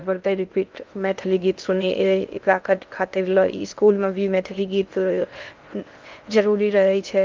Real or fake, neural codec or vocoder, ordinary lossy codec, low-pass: fake; codec, 16 kHz in and 24 kHz out, 0.6 kbps, FocalCodec, streaming, 2048 codes; Opus, 24 kbps; 7.2 kHz